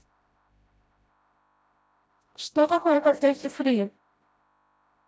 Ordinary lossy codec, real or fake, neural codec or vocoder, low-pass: none; fake; codec, 16 kHz, 0.5 kbps, FreqCodec, smaller model; none